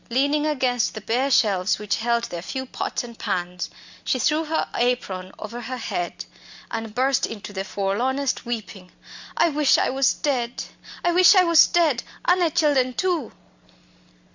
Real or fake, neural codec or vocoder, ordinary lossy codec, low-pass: real; none; Opus, 64 kbps; 7.2 kHz